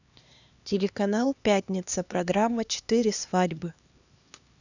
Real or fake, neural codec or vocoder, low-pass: fake; codec, 16 kHz, 2 kbps, X-Codec, HuBERT features, trained on LibriSpeech; 7.2 kHz